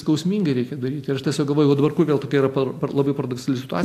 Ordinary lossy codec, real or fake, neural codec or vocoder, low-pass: AAC, 64 kbps; real; none; 14.4 kHz